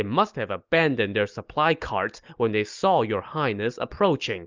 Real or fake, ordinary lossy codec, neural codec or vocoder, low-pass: real; Opus, 24 kbps; none; 7.2 kHz